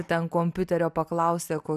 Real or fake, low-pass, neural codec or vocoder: real; 14.4 kHz; none